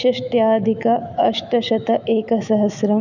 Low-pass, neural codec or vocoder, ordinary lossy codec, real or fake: 7.2 kHz; autoencoder, 48 kHz, 128 numbers a frame, DAC-VAE, trained on Japanese speech; none; fake